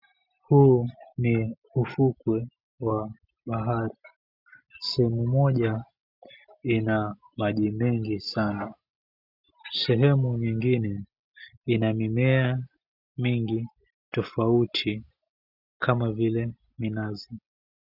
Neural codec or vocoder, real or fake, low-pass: none; real; 5.4 kHz